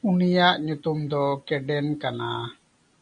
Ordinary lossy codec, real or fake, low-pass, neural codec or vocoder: MP3, 64 kbps; real; 9.9 kHz; none